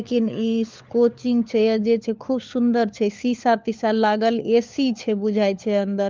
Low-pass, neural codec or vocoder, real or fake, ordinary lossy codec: 7.2 kHz; codec, 16 kHz, 4 kbps, FunCodec, trained on LibriTTS, 50 frames a second; fake; Opus, 24 kbps